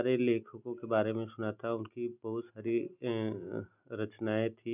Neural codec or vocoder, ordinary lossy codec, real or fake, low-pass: none; none; real; 3.6 kHz